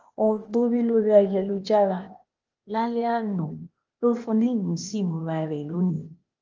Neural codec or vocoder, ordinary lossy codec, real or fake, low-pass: codec, 16 kHz, 0.8 kbps, ZipCodec; Opus, 24 kbps; fake; 7.2 kHz